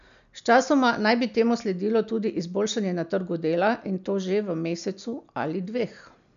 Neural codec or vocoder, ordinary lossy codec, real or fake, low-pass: none; none; real; 7.2 kHz